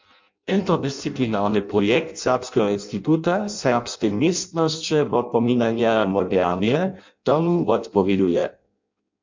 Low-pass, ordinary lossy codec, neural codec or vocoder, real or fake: 7.2 kHz; MP3, 64 kbps; codec, 16 kHz in and 24 kHz out, 0.6 kbps, FireRedTTS-2 codec; fake